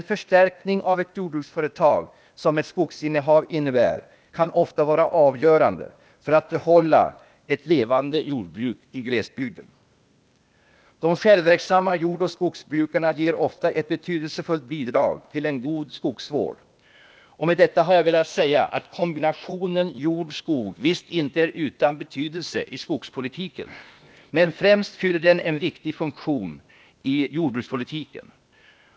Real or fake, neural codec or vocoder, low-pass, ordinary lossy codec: fake; codec, 16 kHz, 0.8 kbps, ZipCodec; none; none